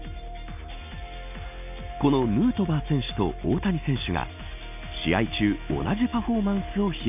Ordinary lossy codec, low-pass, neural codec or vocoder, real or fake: none; 3.6 kHz; none; real